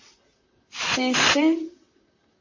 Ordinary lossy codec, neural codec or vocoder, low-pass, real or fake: MP3, 32 kbps; vocoder, 44.1 kHz, 128 mel bands, Pupu-Vocoder; 7.2 kHz; fake